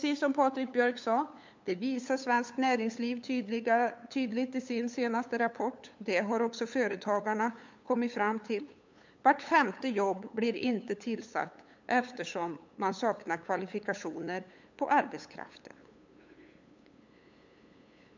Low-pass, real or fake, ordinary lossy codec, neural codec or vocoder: 7.2 kHz; fake; MP3, 64 kbps; codec, 16 kHz, 8 kbps, FunCodec, trained on LibriTTS, 25 frames a second